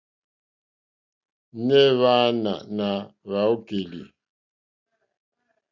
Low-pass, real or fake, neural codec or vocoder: 7.2 kHz; real; none